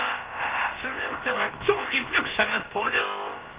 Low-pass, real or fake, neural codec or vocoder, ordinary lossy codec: 3.6 kHz; fake; codec, 16 kHz, about 1 kbps, DyCAST, with the encoder's durations; Opus, 32 kbps